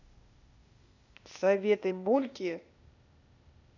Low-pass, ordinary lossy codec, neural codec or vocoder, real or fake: 7.2 kHz; none; codec, 16 kHz, 0.8 kbps, ZipCodec; fake